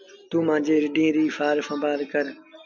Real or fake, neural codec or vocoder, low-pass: real; none; 7.2 kHz